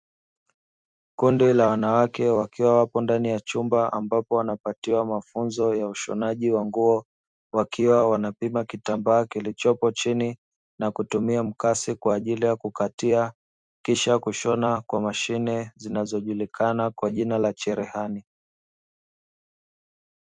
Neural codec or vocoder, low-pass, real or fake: vocoder, 44.1 kHz, 128 mel bands every 256 samples, BigVGAN v2; 9.9 kHz; fake